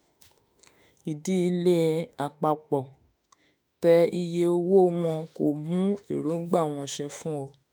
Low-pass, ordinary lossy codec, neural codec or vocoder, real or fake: none; none; autoencoder, 48 kHz, 32 numbers a frame, DAC-VAE, trained on Japanese speech; fake